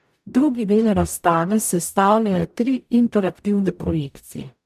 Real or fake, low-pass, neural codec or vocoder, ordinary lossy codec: fake; 14.4 kHz; codec, 44.1 kHz, 0.9 kbps, DAC; MP3, 96 kbps